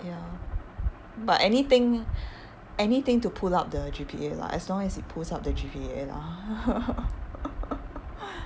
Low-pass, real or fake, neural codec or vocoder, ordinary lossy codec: none; real; none; none